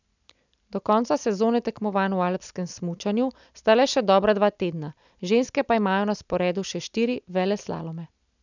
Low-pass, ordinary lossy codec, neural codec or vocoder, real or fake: 7.2 kHz; none; none; real